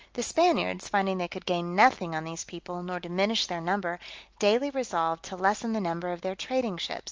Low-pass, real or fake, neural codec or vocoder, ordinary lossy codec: 7.2 kHz; real; none; Opus, 24 kbps